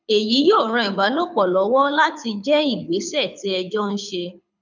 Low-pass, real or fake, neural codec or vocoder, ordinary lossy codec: 7.2 kHz; fake; codec, 24 kHz, 6 kbps, HILCodec; none